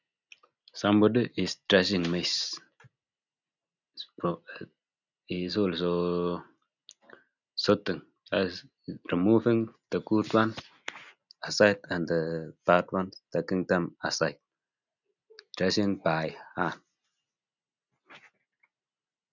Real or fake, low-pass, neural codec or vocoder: real; 7.2 kHz; none